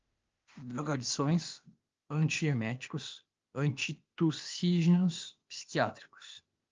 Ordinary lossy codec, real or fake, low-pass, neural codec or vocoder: Opus, 24 kbps; fake; 7.2 kHz; codec, 16 kHz, 0.8 kbps, ZipCodec